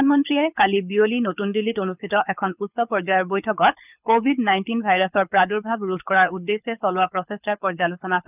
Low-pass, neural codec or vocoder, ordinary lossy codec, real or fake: 3.6 kHz; codec, 24 kHz, 6 kbps, HILCodec; none; fake